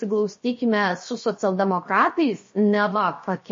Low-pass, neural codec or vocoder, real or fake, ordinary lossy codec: 7.2 kHz; codec, 16 kHz, 0.7 kbps, FocalCodec; fake; MP3, 32 kbps